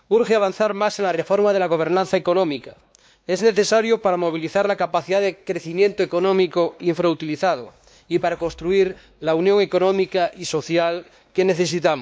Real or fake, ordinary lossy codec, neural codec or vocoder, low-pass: fake; none; codec, 16 kHz, 2 kbps, X-Codec, WavLM features, trained on Multilingual LibriSpeech; none